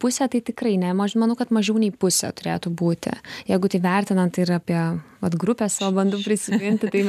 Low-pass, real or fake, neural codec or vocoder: 14.4 kHz; real; none